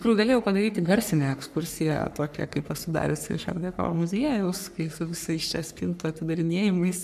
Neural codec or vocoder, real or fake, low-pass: codec, 44.1 kHz, 3.4 kbps, Pupu-Codec; fake; 14.4 kHz